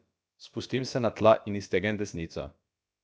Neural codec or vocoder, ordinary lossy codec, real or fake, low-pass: codec, 16 kHz, about 1 kbps, DyCAST, with the encoder's durations; none; fake; none